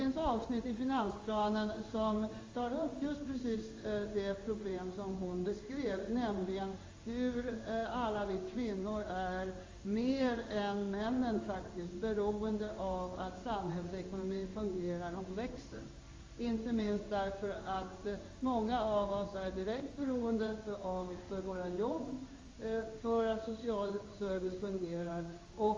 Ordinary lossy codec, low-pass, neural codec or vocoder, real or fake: Opus, 32 kbps; 7.2 kHz; codec, 16 kHz in and 24 kHz out, 1 kbps, XY-Tokenizer; fake